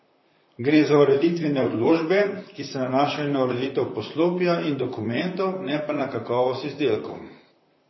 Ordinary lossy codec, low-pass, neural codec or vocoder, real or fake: MP3, 24 kbps; 7.2 kHz; vocoder, 44.1 kHz, 128 mel bands, Pupu-Vocoder; fake